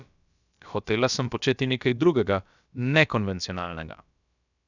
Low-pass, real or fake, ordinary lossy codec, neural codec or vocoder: 7.2 kHz; fake; none; codec, 16 kHz, about 1 kbps, DyCAST, with the encoder's durations